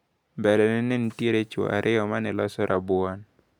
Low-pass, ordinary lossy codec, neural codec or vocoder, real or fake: 19.8 kHz; none; none; real